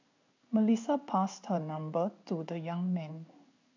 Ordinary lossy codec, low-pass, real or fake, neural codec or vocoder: MP3, 64 kbps; 7.2 kHz; fake; codec, 16 kHz in and 24 kHz out, 1 kbps, XY-Tokenizer